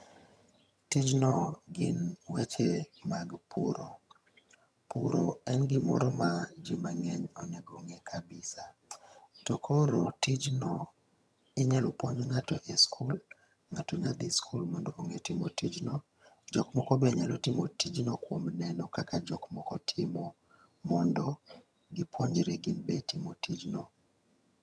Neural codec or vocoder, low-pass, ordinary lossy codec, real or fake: vocoder, 22.05 kHz, 80 mel bands, HiFi-GAN; none; none; fake